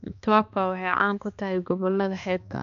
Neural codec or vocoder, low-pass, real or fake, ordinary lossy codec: codec, 16 kHz, 2 kbps, X-Codec, HuBERT features, trained on balanced general audio; 7.2 kHz; fake; none